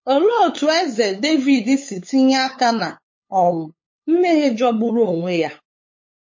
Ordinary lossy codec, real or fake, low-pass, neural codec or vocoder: MP3, 32 kbps; fake; 7.2 kHz; codec, 16 kHz, 8 kbps, FunCodec, trained on LibriTTS, 25 frames a second